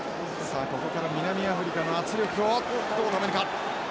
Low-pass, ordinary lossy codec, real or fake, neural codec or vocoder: none; none; real; none